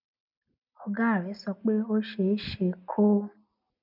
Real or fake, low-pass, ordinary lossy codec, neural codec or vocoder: real; 5.4 kHz; none; none